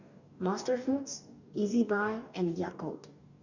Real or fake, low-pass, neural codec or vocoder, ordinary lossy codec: fake; 7.2 kHz; codec, 44.1 kHz, 2.6 kbps, DAC; none